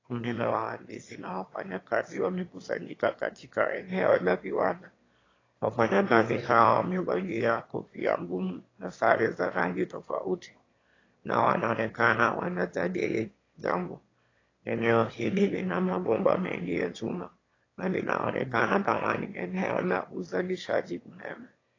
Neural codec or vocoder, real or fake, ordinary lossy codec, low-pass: autoencoder, 22.05 kHz, a latent of 192 numbers a frame, VITS, trained on one speaker; fake; AAC, 32 kbps; 7.2 kHz